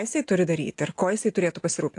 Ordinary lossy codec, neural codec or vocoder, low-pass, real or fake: AAC, 48 kbps; none; 10.8 kHz; real